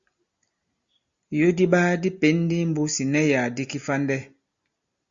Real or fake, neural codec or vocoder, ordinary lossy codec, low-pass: real; none; Opus, 64 kbps; 7.2 kHz